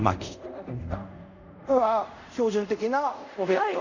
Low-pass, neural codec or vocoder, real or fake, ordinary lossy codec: 7.2 kHz; codec, 24 kHz, 0.5 kbps, DualCodec; fake; none